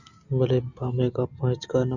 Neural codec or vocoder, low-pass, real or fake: none; 7.2 kHz; real